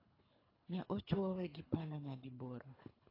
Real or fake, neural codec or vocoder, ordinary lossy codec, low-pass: fake; codec, 24 kHz, 3 kbps, HILCodec; AAC, 24 kbps; 5.4 kHz